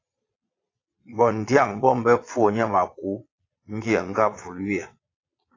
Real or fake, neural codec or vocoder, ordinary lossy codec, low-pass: fake; vocoder, 22.05 kHz, 80 mel bands, Vocos; AAC, 32 kbps; 7.2 kHz